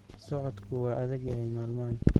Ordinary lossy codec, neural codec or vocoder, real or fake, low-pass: Opus, 16 kbps; codec, 44.1 kHz, 7.8 kbps, Pupu-Codec; fake; 19.8 kHz